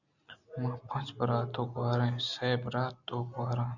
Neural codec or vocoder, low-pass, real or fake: none; 7.2 kHz; real